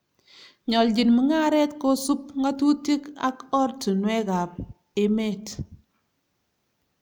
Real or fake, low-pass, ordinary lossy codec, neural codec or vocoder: real; none; none; none